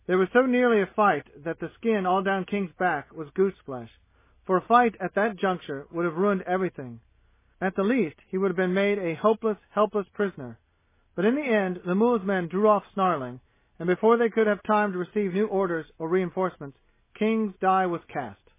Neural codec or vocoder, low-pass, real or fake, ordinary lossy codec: none; 3.6 kHz; real; MP3, 16 kbps